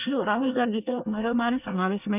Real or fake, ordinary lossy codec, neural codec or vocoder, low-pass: fake; none; codec, 24 kHz, 1 kbps, SNAC; 3.6 kHz